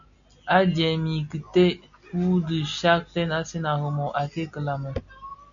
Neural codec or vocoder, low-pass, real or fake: none; 7.2 kHz; real